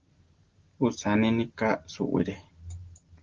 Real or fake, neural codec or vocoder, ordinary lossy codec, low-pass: real; none; Opus, 16 kbps; 7.2 kHz